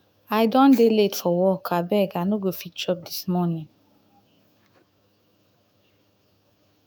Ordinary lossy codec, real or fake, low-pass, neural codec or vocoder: none; fake; none; autoencoder, 48 kHz, 128 numbers a frame, DAC-VAE, trained on Japanese speech